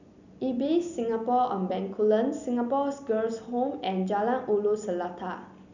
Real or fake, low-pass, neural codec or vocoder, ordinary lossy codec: real; 7.2 kHz; none; none